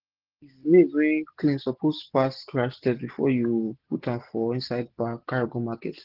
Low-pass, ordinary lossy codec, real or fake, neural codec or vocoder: 5.4 kHz; Opus, 16 kbps; real; none